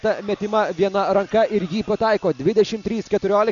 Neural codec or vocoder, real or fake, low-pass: none; real; 7.2 kHz